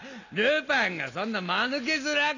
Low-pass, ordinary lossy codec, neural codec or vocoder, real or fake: 7.2 kHz; none; none; real